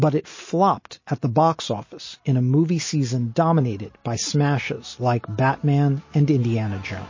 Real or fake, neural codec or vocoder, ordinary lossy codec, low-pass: real; none; MP3, 32 kbps; 7.2 kHz